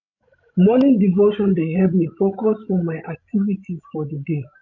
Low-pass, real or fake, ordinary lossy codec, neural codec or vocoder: 7.2 kHz; fake; none; codec, 16 kHz, 16 kbps, FreqCodec, larger model